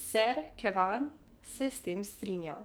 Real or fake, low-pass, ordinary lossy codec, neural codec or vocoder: fake; none; none; codec, 44.1 kHz, 2.6 kbps, SNAC